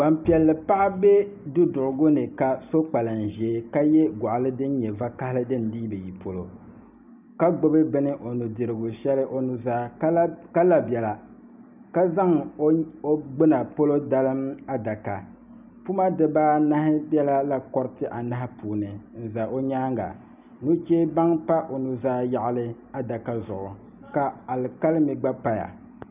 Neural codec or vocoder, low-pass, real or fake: none; 3.6 kHz; real